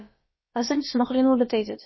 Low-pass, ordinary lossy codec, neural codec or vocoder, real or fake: 7.2 kHz; MP3, 24 kbps; codec, 16 kHz, about 1 kbps, DyCAST, with the encoder's durations; fake